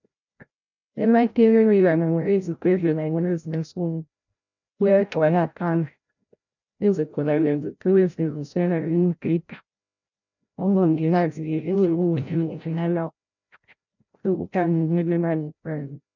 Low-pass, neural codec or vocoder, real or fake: 7.2 kHz; codec, 16 kHz, 0.5 kbps, FreqCodec, larger model; fake